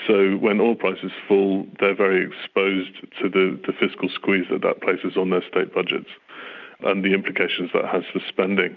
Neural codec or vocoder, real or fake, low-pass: none; real; 7.2 kHz